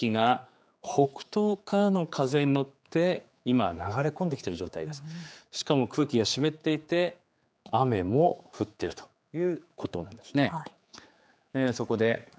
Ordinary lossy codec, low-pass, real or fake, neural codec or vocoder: none; none; fake; codec, 16 kHz, 4 kbps, X-Codec, HuBERT features, trained on general audio